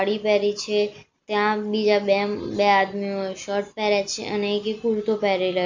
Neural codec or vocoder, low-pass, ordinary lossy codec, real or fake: none; 7.2 kHz; MP3, 64 kbps; real